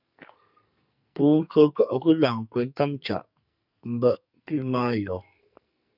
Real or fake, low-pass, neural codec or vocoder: fake; 5.4 kHz; codec, 44.1 kHz, 2.6 kbps, SNAC